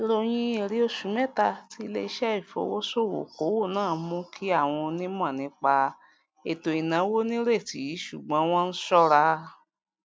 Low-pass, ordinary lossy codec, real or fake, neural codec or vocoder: none; none; real; none